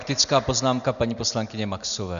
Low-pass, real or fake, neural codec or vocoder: 7.2 kHz; real; none